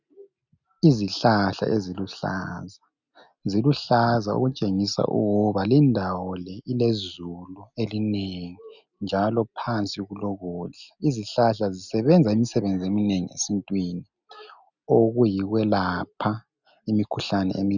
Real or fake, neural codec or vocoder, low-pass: real; none; 7.2 kHz